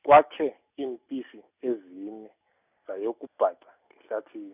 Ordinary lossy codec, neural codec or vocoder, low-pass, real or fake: none; none; 3.6 kHz; real